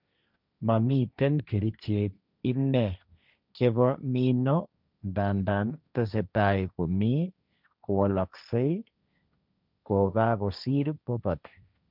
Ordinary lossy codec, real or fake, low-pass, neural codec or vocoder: none; fake; 5.4 kHz; codec, 16 kHz, 1.1 kbps, Voila-Tokenizer